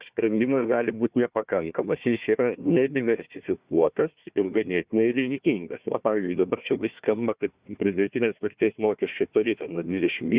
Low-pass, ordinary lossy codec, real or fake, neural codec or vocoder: 3.6 kHz; Opus, 64 kbps; fake; codec, 16 kHz, 1 kbps, FunCodec, trained on Chinese and English, 50 frames a second